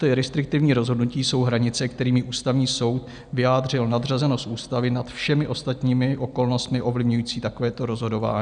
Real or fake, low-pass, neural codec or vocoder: real; 9.9 kHz; none